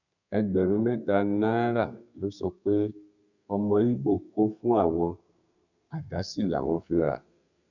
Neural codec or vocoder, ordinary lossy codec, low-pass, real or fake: codec, 32 kHz, 1.9 kbps, SNAC; none; 7.2 kHz; fake